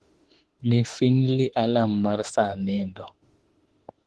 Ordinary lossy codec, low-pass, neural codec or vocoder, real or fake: Opus, 16 kbps; 10.8 kHz; autoencoder, 48 kHz, 32 numbers a frame, DAC-VAE, trained on Japanese speech; fake